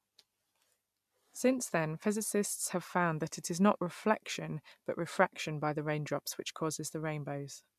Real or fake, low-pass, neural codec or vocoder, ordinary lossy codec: real; 14.4 kHz; none; MP3, 96 kbps